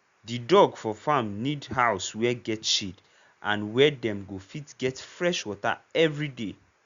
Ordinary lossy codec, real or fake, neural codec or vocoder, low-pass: Opus, 64 kbps; real; none; 7.2 kHz